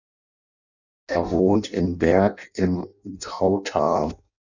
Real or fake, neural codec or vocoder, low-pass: fake; codec, 16 kHz in and 24 kHz out, 0.6 kbps, FireRedTTS-2 codec; 7.2 kHz